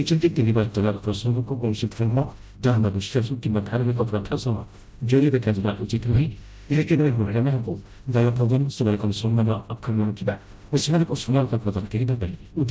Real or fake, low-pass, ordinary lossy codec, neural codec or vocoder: fake; none; none; codec, 16 kHz, 0.5 kbps, FreqCodec, smaller model